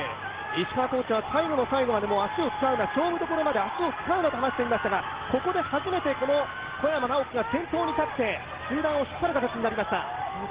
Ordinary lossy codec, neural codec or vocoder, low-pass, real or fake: Opus, 16 kbps; none; 3.6 kHz; real